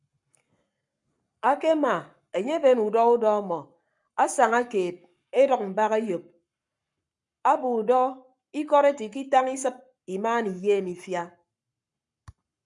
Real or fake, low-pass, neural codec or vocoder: fake; 10.8 kHz; codec, 44.1 kHz, 7.8 kbps, Pupu-Codec